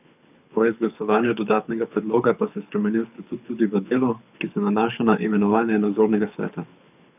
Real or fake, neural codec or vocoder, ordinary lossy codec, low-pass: fake; codec, 24 kHz, 6 kbps, HILCodec; none; 3.6 kHz